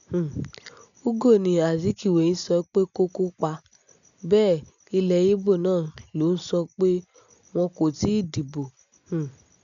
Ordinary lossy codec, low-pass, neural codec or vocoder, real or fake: none; 7.2 kHz; none; real